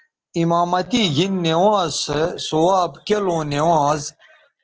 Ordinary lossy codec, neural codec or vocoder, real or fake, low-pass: Opus, 16 kbps; none; real; 7.2 kHz